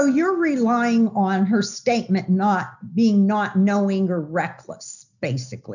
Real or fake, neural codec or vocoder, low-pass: real; none; 7.2 kHz